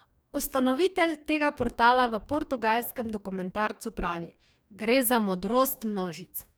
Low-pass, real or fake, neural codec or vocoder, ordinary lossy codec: none; fake; codec, 44.1 kHz, 2.6 kbps, DAC; none